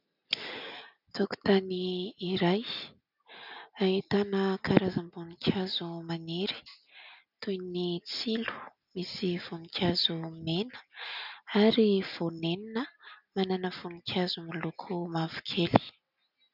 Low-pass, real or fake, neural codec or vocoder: 5.4 kHz; real; none